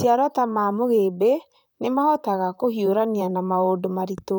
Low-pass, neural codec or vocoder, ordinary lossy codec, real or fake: none; vocoder, 44.1 kHz, 128 mel bands, Pupu-Vocoder; none; fake